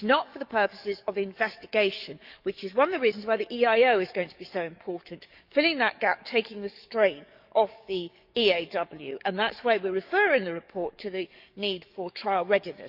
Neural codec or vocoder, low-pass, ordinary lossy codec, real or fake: codec, 44.1 kHz, 7.8 kbps, DAC; 5.4 kHz; none; fake